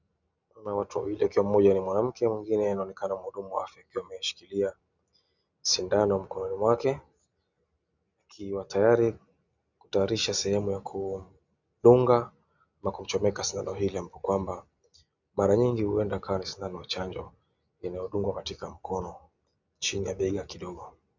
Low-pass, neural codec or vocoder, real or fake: 7.2 kHz; none; real